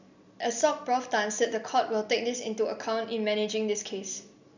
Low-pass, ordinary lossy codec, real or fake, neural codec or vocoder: 7.2 kHz; none; real; none